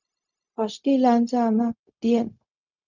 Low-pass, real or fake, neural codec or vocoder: 7.2 kHz; fake; codec, 16 kHz, 0.4 kbps, LongCat-Audio-Codec